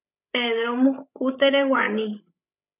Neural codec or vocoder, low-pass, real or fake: codec, 16 kHz, 16 kbps, FreqCodec, larger model; 3.6 kHz; fake